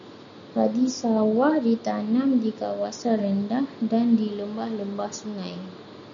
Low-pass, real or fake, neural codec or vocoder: 7.2 kHz; real; none